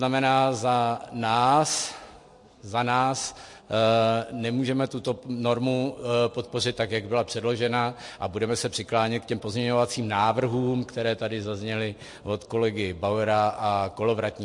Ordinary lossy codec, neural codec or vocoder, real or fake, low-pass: MP3, 48 kbps; none; real; 10.8 kHz